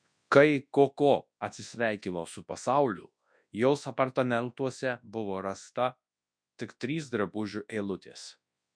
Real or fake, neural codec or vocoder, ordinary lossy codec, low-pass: fake; codec, 24 kHz, 0.9 kbps, WavTokenizer, large speech release; MP3, 64 kbps; 9.9 kHz